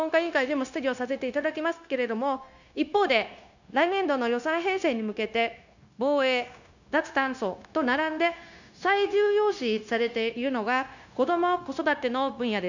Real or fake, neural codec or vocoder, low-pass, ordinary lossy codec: fake; codec, 16 kHz, 0.9 kbps, LongCat-Audio-Codec; 7.2 kHz; none